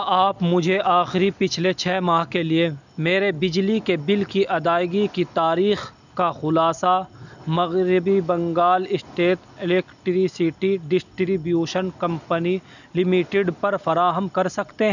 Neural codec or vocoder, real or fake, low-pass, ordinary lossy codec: none; real; 7.2 kHz; none